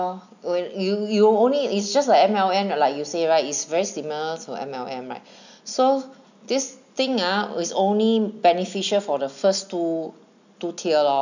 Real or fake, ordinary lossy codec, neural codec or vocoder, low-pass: real; none; none; 7.2 kHz